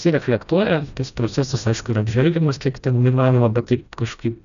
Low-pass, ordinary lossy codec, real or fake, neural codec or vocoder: 7.2 kHz; MP3, 96 kbps; fake; codec, 16 kHz, 1 kbps, FreqCodec, smaller model